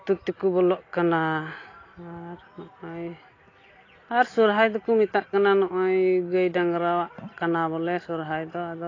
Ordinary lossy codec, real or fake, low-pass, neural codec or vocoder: AAC, 32 kbps; real; 7.2 kHz; none